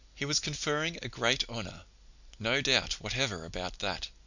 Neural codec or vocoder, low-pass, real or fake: none; 7.2 kHz; real